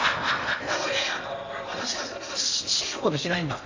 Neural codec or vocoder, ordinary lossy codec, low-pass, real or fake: codec, 16 kHz in and 24 kHz out, 0.8 kbps, FocalCodec, streaming, 65536 codes; AAC, 48 kbps; 7.2 kHz; fake